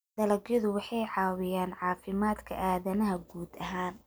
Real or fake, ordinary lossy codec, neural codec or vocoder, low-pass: real; none; none; none